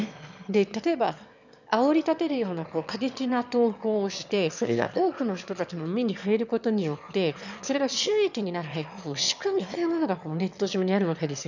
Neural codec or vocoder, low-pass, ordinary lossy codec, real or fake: autoencoder, 22.05 kHz, a latent of 192 numbers a frame, VITS, trained on one speaker; 7.2 kHz; none; fake